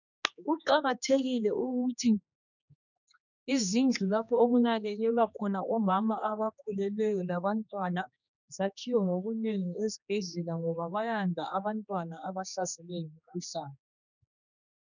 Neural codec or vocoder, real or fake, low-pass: codec, 16 kHz, 2 kbps, X-Codec, HuBERT features, trained on general audio; fake; 7.2 kHz